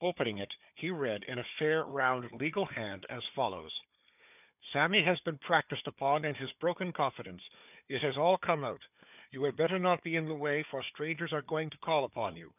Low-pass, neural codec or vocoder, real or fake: 3.6 kHz; codec, 16 kHz, 4 kbps, FunCodec, trained on Chinese and English, 50 frames a second; fake